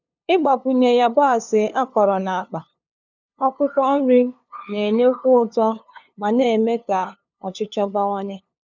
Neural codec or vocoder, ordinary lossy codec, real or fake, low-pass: codec, 16 kHz, 2 kbps, FunCodec, trained on LibriTTS, 25 frames a second; none; fake; 7.2 kHz